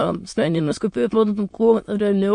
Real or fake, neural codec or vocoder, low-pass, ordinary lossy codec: fake; autoencoder, 22.05 kHz, a latent of 192 numbers a frame, VITS, trained on many speakers; 9.9 kHz; MP3, 48 kbps